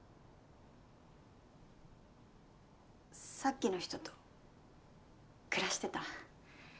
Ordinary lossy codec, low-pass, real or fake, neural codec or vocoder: none; none; real; none